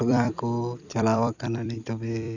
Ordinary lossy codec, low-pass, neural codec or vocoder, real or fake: none; 7.2 kHz; vocoder, 44.1 kHz, 128 mel bands every 256 samples, BigVGAN v2; fake